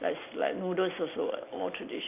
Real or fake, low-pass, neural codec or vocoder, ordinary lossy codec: real; 3.6 kHz; none; none